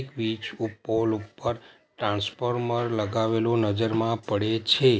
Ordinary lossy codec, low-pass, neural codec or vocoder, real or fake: none; none; none; real